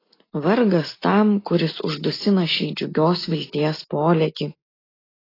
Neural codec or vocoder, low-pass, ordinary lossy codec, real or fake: none; 5.4 kHz; AAC, 24 kbps; real